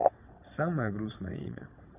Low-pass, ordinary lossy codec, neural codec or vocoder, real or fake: 3.6 kHz; none; vocoder, 22.05 kHz, 80 mel bands, WaveNeXt; fake